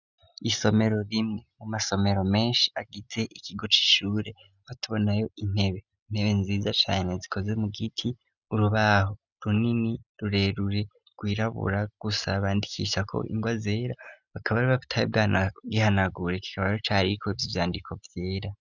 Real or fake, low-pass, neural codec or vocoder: real; 7.2 kHz; none